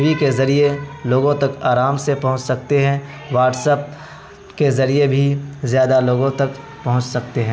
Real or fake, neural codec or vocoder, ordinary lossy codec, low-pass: real; none; none; none